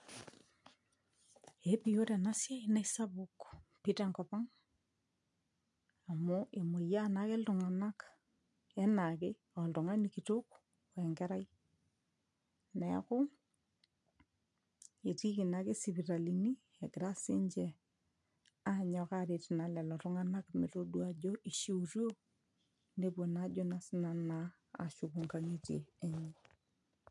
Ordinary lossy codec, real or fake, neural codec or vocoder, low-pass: MP3, 64 kbps; real; none; 10.8 kHz